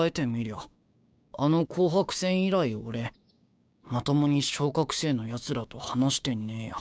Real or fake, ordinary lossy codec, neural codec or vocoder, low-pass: fake; none; codec, 16 kHz, 6 kbps, DAC; none